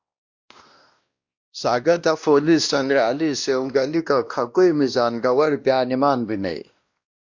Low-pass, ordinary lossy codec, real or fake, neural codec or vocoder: 7.2 kHz; Opus, 64 kbps; fake; codec, 16 kHz, 1 kbps, X-Codec, WavLM features, trained on Multilingual LibriSpeech